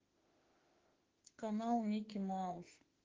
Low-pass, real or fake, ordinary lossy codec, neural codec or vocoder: 7.2 kHz; fake; Opus, 16 kbps; autoencoder, 48 kHz, 32 numbers a frame, DAC-VAE, trained on Japanese speech